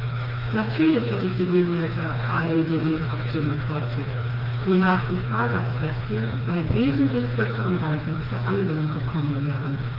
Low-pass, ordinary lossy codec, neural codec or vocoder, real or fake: 5.4 kHz; Opus, 32 kbps; codec, 16 kHz, 2 kbps, FreqCodec, smaller model; fake